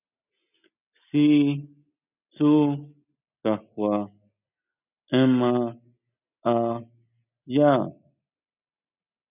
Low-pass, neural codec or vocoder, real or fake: 3.6 kHz; none; real